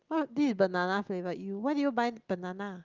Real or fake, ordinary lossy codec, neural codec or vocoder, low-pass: real; Opus, 32 kbps; none; 7.2 kHz